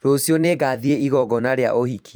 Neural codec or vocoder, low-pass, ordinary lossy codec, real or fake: vocoder, 44.1 kHz, 128 mel bands every 256 samples, BigVGAN v2; none; none; fake